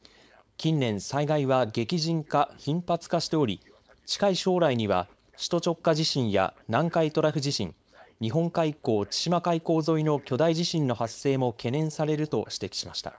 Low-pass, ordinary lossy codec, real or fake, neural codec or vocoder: none; none; fake; codec, 16 kHz, 4.8 kbps, FACodec